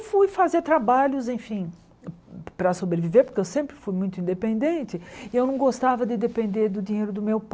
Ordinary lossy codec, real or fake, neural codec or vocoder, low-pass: none; real; none; none